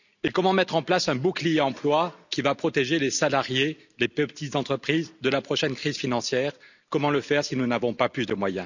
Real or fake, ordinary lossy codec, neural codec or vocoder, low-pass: real; none; none; 7.2 kHz